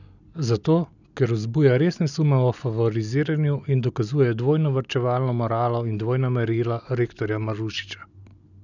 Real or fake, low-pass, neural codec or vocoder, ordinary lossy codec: real; 7.2 kHz; none; none